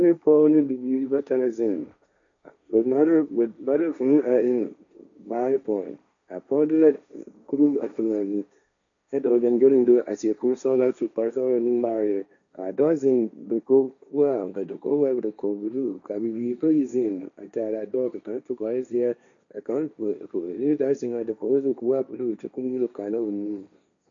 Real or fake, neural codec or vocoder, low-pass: fake; codec, 16 kHz, 1.1 kbps, Voila-Tokenizer; 7.2 kHz